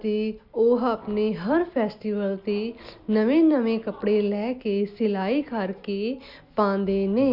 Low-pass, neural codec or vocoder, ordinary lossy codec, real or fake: 5.4 kHz; none; Opus, 64 kbps; real